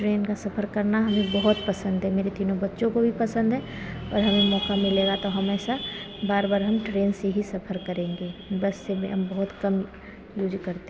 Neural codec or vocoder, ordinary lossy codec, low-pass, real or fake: none; none; none; real